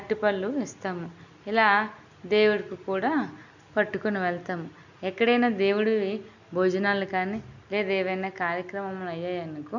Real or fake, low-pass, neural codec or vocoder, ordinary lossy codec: real; 7.2 kHz; none; none